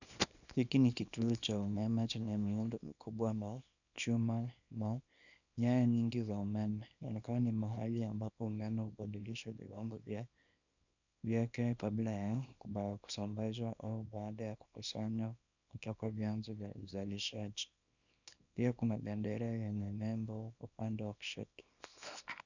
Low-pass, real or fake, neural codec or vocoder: 7.2 kHz; fake; codec, 24 kHz, 0.9 kbps, WavTokenizer, small release